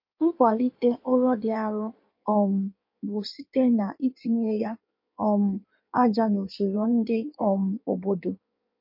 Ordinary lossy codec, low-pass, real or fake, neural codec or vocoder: MP3, 32 kbps; 5.4 kHz; fake; codec, 16 kHz in and 24 kHz out, 1.1 kbps, FireRedTTS-2 codec